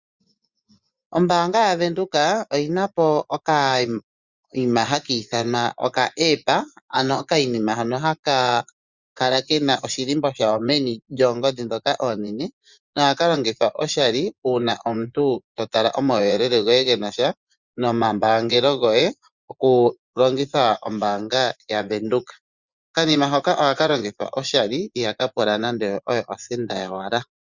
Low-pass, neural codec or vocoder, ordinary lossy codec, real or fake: 7.2 kHz; vocoder, 24 kHz, 100 mel bands, Vocos; Opus, 64 kbps; fake